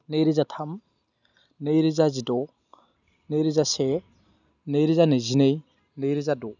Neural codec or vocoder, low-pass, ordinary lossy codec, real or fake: none; 7.2 kHz; none; real